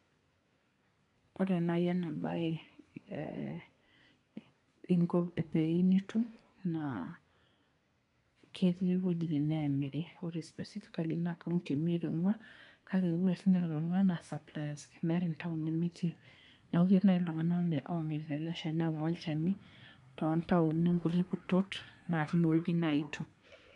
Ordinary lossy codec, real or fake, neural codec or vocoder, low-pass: none; fake; codec, 24 kHz, 1 kbps, SNAC; 10.8 kHz